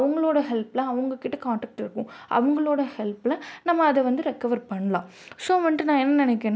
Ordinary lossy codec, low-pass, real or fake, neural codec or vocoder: none; none; real; none